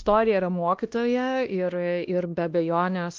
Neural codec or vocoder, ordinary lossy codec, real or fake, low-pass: codec, 16 kHz, 1 kbps, X-Codec, WavLM features, trained on Multilingual LibriSpeech; Opus, 24 kbps; fake; 7.2 kHz